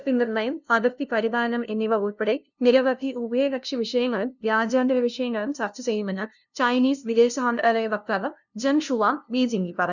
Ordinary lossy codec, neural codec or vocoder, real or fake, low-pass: none; codec, 16 kHz, 0.5 kbps, FunCodec, trained on LibriTTS, 25 frames a second; fake; 7.2 kHz